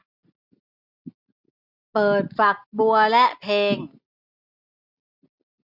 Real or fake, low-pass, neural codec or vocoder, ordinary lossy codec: real; 5.4 kHz; none; none